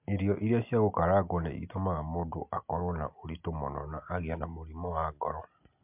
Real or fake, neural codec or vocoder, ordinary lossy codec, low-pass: real; none; MP3, 32 kbps; 3.6 kHz